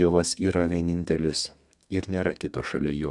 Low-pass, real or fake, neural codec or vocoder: 10.8 kHz; fake; codec, 32 kHz, 1.9 kbps, SNAC